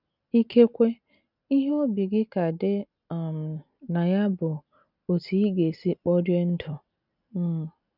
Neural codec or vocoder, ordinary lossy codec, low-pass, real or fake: none; none; 5.4 kHz; real